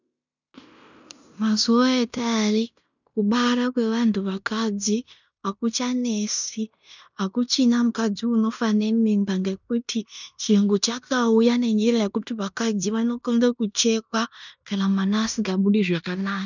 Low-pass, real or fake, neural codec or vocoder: 7.2 kHz; fake; codec, 16 kHz in and 24 kHz out, 0.9 kbps, LongCat-Audio-Codec, fine tuned four codebook decoder